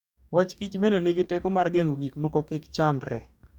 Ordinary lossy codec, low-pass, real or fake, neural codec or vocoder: none; 19.8 kHz; fake; codec, 44.1 kHz, 2.6 kbps, DAC